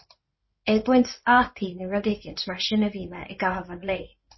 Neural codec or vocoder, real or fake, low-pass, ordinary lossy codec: codec, 16 kHz in and 24 kHz out, 2.2 kbps, FireRedTTS-2 codec; fake; 7.2 kHz; MP3, 24 kbps